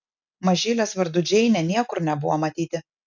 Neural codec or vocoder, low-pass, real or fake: none; 7.2 kHz; real